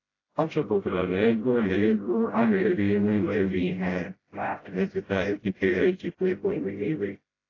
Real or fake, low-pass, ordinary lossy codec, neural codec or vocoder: fake; 7.2 kHz; AAC, 32 kbps; codec, 16 kHz, 0.5 kbps, FreqCodec, smaller model